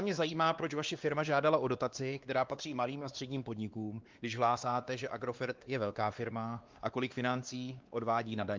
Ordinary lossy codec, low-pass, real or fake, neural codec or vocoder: Opus, 32 kbps; 7.2 kHz; fake; codec, 16 kHz, 2 kbps, X-Codec, WavLM features, trained on Multilingual LibriSpeech